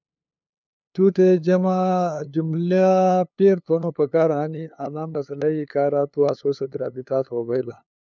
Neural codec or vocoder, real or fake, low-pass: codec, 16 kHz, 2 kbps, FunCodec, trained on LibriTTS, 25 frames a second; fake; 7.2 kHz